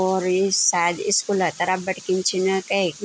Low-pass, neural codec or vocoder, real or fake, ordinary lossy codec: none; none; real; none